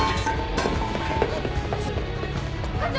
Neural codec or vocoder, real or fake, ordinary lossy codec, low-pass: none; real; none; none